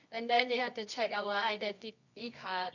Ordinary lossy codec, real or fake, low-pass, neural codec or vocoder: none; fake; 7.2 kHz; codec, 24 kHz, 0.9 kbps, WavTokenizer, medium music audio release